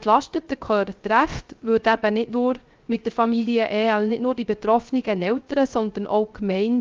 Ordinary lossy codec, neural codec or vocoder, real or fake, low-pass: Opus, 24 kbps; codec, 16 kHz, 0.3 kbps, FocalCodec; fake; 7.2 kHz